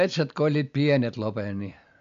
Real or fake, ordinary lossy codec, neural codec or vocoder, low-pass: real; AAC, 64 kbps; none; 7.2 kHz